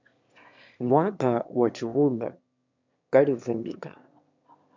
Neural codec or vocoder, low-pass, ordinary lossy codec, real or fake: autoencoder, 22.05 kHz, a latent of 192 numbers a frame, VITS, trained on one speaker; 7.2 kHz; MP3, 64 kbps; fake